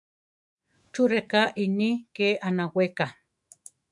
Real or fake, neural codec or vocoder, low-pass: fake; autoencoder, 48 kHz, 128 numbers a frame, DAC-VAE, trained on Japanese speech; 10.8 kHz